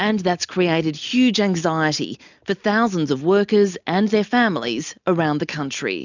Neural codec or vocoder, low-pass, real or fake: none; 7.2 kHz; real